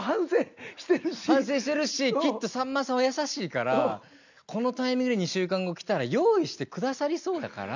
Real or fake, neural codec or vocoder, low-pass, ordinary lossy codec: real; none; 7.2 kHz; none